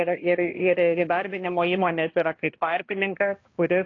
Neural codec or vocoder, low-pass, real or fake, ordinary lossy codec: codec, 16 kHz, 1 kbps, X-Codec, HuBERT features, trained on balanced general audio; 7.2 kHz; fake; AAC, 32 kbps